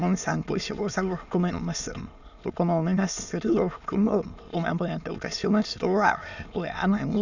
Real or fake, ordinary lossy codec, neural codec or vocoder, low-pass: fake; none; autoencoder, 22.05 kHz, a latent of 192 numbers a frame, VITS, trained on many speakers; 7.2 kHz